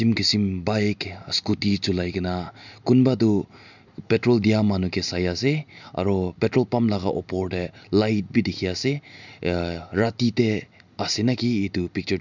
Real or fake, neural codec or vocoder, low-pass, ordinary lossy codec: real; none; 7.2 kHz; none